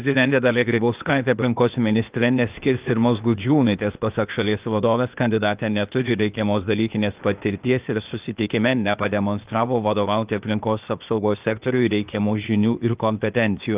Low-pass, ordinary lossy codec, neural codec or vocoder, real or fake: 3.6 kHz; Opus, 32 kbps; codec, 16 kHz, 0.8 kbps, ZipCodec; fake